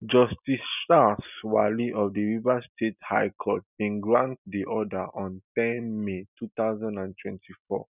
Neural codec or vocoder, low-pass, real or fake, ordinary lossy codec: none; 3.6 kHz; real; none